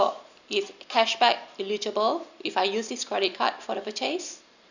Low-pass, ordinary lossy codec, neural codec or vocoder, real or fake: 7.2 kHz; none; none; real